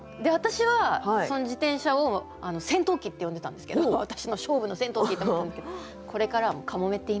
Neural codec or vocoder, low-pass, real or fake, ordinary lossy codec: none; none; real; none